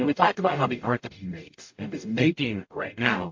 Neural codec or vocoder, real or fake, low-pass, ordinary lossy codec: codec, 44.1 kHz, 0.9 kbps, DAC; fake; 7.2 kHz; MP3, 48 kbps